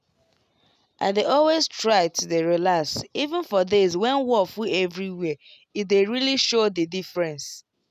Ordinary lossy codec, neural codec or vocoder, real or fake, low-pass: none; none; real; 14.4 kHz